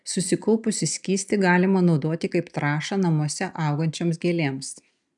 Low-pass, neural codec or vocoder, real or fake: 10.8 kHz; none; real